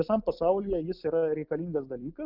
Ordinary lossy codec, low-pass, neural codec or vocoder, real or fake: Opus, 24 kbps; 5.4 kHz; none; real